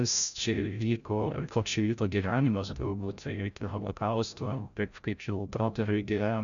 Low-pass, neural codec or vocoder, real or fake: 7.2 kHz; codec, 16 kHz, 0.5 kbps, FreqCodec, larger model; fake